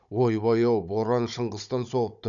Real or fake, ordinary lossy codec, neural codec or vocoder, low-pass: fake; none; codec, 16 kHz, 16 kbps, FunCodec, trained on Chinese and English, 50 frames a second; 7.2 kHz